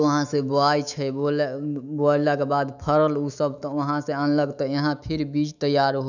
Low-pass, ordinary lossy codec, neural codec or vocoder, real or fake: 7.2 kHz; none; autoencoder, 48 kHz, 128 numbers a frame, DAC-VAE, trained on Japanese speech; fake